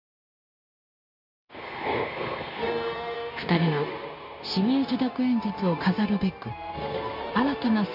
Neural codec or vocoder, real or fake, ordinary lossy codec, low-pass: codec, 16 kHz, 0.9 kbps, LongCat-Audio-Codec; fake; none; 5.4 kHz